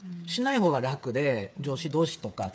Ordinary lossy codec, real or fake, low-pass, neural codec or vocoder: none; fake; none; codec, 16 kHz, 8 kbps, FreqCodec, smaller model